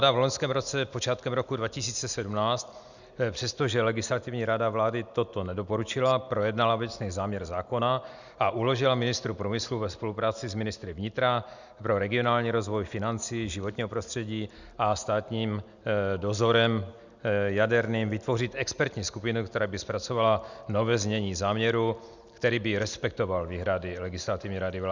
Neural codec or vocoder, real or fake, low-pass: none; real; 7.2 kHz